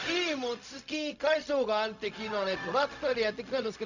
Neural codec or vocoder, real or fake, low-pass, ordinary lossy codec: codec, 16 kHz, 0.4 kbps, LongCat-Audio-Codec; fake; 7.2 kHz; none